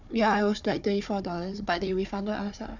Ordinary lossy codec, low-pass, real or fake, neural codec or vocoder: none; 7.2 kHz; fake; codec, 16 kHz, 4 kbps, FunCodec, trained on Chinese and English, 50 frames a second